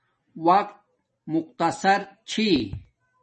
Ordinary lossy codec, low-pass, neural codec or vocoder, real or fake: MP3, 32 kbps; 10.8 kHz; none; real